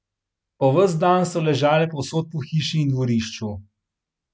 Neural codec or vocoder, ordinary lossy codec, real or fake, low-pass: none; none; real; none